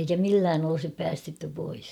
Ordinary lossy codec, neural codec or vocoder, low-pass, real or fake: none; vocoder, 44.1 kHz, 128 mel bands every 256 samples, BigVGAN v2; 19.8 kHz; fake